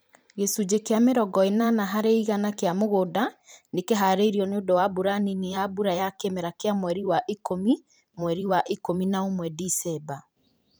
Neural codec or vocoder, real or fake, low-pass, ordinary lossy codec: vocoder, 44.1 kHz, 128 mel bands every 512 samples, BigVGAN v2; fake; none; none